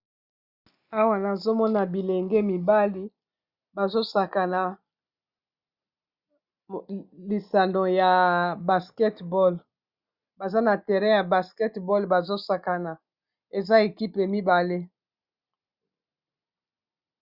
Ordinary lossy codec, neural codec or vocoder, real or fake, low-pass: Opus, 64 kbps; none; real; 5.4 kHz